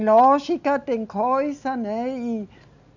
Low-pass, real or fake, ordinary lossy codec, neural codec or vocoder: 7.2 kHz; real; none; none